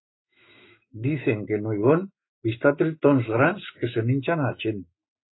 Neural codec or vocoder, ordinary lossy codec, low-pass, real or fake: autoencoder, 48 kHz, 128 numbers a frame, DAC-VAE, trained on Japanese speech; AAC, 16 kbps; 7.2 kHz; fake